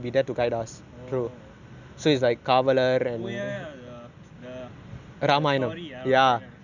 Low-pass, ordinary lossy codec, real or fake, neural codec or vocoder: 7.2 kHz; none; real; none